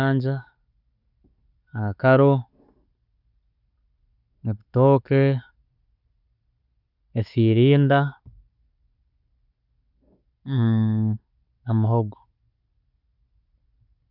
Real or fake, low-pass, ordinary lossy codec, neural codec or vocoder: real; 5.4 kHz; none; none